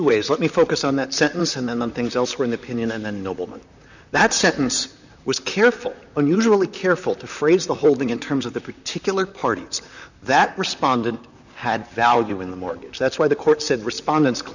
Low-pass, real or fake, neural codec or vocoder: 7.2 kHz; fake; vocoder, 44.1 kHz, 128 mel bands, Pupu-Vocoder